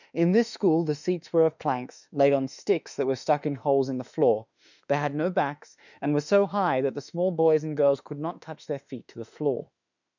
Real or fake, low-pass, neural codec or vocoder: fake; 7.2 kHz; autoencoder, 48 kHz, 32 numbers a frame, DAC-VAE, trained on Japanese speech